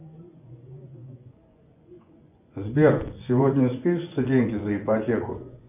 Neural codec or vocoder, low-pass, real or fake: vocoder, 24 kHz, 100 mel bands, Vocos; 3.6 kHz; fake